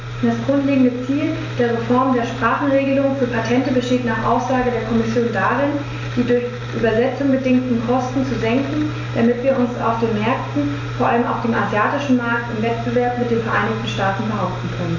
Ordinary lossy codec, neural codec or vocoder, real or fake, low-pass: AAC, 48 kbps; none; real; 7.2 kHz